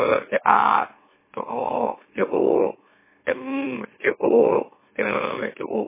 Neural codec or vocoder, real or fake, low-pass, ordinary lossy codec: autoencoder, 44.1 kHz, a latent of 192 numbers a frame, MeloTTS; fake; 3.6 kHz; MP3, 16 kbps